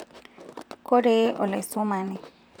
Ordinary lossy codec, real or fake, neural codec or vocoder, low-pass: none; fake; vocoder, 44.1 kHz, 128 mel bands, Pupu-Vocoder; none